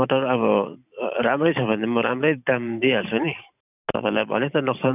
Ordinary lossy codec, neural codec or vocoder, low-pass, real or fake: none; vocoder, 44.1 kHz, 128 mel bands every 512 samples, BigVGAN v2; 3.6 kHz; fake